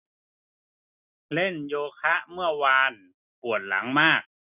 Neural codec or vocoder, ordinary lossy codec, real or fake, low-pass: none; none; real; 3.6 kHz